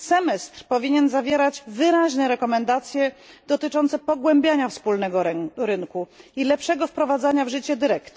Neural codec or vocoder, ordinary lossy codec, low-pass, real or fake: none; none; none; real